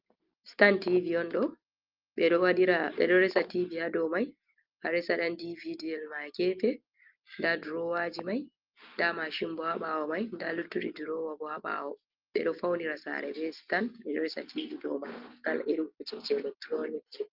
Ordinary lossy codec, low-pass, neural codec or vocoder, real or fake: Opus, 24 kbps; 5.4 kHz; none; real